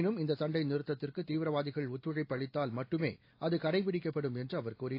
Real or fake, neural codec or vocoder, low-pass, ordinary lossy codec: real; none; 5.4 kHz; AAC, 32 kbps